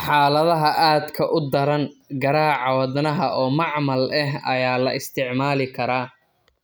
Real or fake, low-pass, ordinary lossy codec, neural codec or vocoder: real; none; none; none